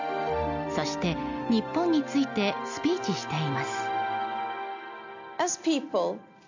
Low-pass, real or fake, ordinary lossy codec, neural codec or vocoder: 7.2 kHz; real; none; none